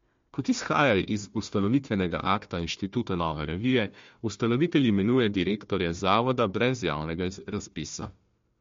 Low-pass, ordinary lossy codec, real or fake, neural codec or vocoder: 7.2 kHz; MP3, 48 kbps; fake; codec, 16 kHz, 1 kbps, FunCodec, trained on Chinese and English, 50 frames a second